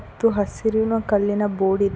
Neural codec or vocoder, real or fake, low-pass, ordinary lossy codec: none; real; none; none